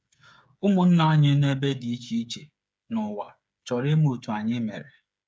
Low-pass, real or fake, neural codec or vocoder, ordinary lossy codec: none; fake; codec, 16 kHz, 8 kbps, FreqCodec, smaller model; none